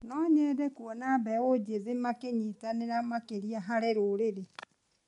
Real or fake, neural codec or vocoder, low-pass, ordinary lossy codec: real; none; 10.8 kHz; MP3, 64 kbps